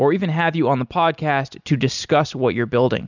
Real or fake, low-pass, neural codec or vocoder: real; 7.2 kHz; none